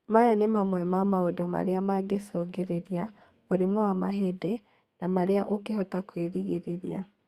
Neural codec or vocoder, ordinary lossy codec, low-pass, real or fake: codec, 32 kHz, 1.9 kbps, SNAC; Opus, 64 kbps; 14.4 kHz; fake